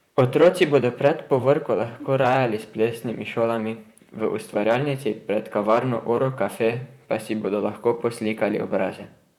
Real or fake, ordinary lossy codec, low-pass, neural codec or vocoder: fake; none; 19.8 kHz; vocoder, 44.1 kHz, 128 mel bands, Pupu-Vocoder